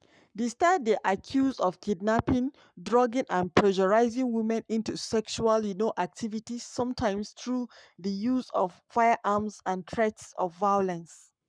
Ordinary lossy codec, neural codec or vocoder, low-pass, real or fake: none; codec, 44.1 kHz, 7.8 kbps, Pupu-Codec; 9.9 kHz; fake